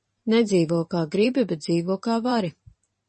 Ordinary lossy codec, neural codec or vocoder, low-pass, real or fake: MP3, 32 kbps; vocoder, 44.1 kHz, 128 mel bands every 512 samples, BigVGAN v2; 10.8 kHz; fake